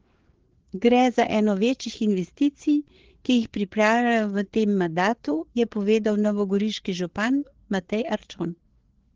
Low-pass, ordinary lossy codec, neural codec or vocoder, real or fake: 7.2 kHz; Opus, 16 kbps; codec, 16 kHz, 4 kbps, FreqCodec, larger model; fake